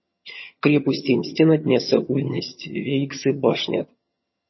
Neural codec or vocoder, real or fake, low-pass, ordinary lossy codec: vocoder, 22.05 kHz, 80 mel bands, HiFi-GAN; fake; 7.2 kHz; MP3, 24 kbps